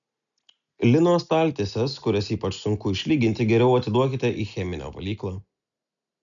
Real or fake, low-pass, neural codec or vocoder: real; 7.2 kHz; none